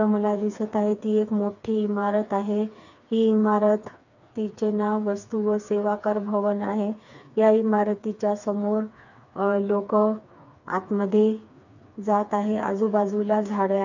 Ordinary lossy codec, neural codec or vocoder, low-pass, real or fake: none; codec, 16 kHz, 4 kbps, FreqCodec, smaller model; 7.2 kHz; fake